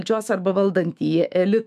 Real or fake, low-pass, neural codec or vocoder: fake; 14.4 kHz; autoencoder, 48 kHz, 128 numbers a frame, DAC-VAE, trained on Japanese speech